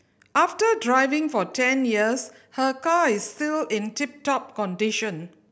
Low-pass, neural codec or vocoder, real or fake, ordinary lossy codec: none; none; real; none